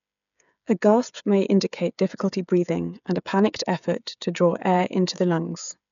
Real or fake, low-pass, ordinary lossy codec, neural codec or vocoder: fake; 7.2 kHz; none; codec, 16 kHz, 8 kbps, FreqCodec, smaller model